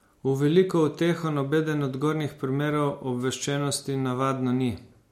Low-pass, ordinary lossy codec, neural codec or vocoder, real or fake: 19.8 kHz; MP3, 64 kbps; none; real